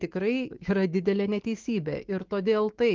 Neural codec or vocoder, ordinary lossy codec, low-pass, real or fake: autoencoder, 48 kHz, 128 numbers a frame, DAC-VAE, trained on Japanese speech; Opus, 16 kbps; 7.2 kHz; fake